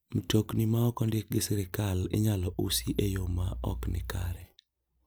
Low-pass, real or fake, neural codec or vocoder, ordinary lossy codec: none; real; none; none